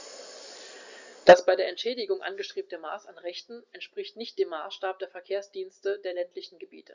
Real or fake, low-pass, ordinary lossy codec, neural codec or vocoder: real; 7.2 kHz; Opus, 64 kbps; none